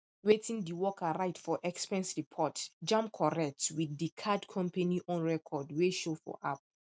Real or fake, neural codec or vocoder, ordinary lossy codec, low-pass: real; none; none; none